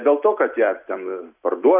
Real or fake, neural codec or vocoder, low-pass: real; none; 3.6 kHz